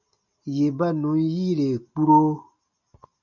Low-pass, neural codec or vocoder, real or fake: 7.2 kHz; none; real